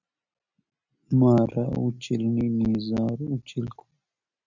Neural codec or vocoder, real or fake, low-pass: none; real; 7.2 kHz